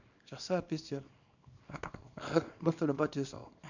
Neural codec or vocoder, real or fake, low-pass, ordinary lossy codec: codec, 24 kHz, 0.9 kbps, WavTokenizer, small release; fake; 7.2 kHz; none